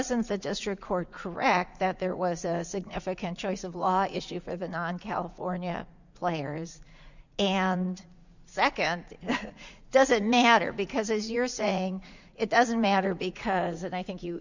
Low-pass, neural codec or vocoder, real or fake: 7.2 kHz; vocoder, 44.1 kHz, 80 mel bands, Vocos; fake